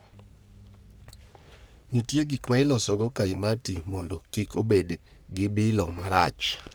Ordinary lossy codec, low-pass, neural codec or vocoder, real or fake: none; none; codec, 44.1 kHz, 3.4 kbps, Pupu-Codec; fake